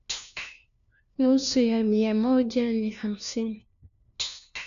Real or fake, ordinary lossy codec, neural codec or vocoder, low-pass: fake; none; codec, 16 kHz, 1 kbps, FunCodec, trained on LibriTTS, 50 frames a second; 7.2 kHz